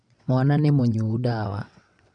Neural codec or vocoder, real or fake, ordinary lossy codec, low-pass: vocoder, 22.05 kHz, 80 mel bands, WaveNeXt; fake; none; 9.9 kHz